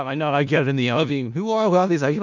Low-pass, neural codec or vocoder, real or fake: 7.2 kHz; codec, 16 kHz in and 24 kHz out, 0.4 kbps, LongCat-Audio-Codec, four codebook decoder; fake